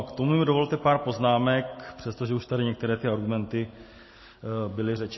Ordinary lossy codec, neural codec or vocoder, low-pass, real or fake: MP3, 24 kbps; none; 7.2 kHz; real